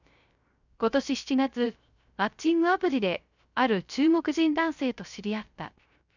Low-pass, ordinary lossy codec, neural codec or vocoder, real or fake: 7.2 kHz; none; codec, 16 kHz, 0.3 kbps, FocalCodec; fake